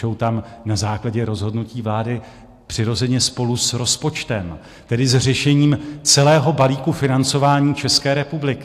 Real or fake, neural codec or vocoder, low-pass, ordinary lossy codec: real; none; 14.4 kHz; AAC, 64 kbps